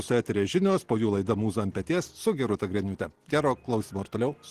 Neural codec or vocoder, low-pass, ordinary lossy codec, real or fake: none; 14.4 kHz; Opus, 16 kbps; real